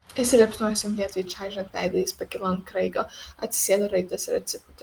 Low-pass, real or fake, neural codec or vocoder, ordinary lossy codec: 19.8 kHz; fake; vocoder, 44.1 kHz, 128 mel bands every 512 samples, BigVGAN v2; Opus, 24 kbps